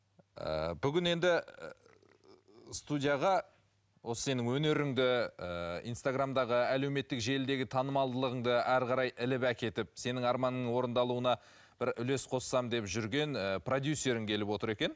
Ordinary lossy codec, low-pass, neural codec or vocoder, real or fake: none; none; none; real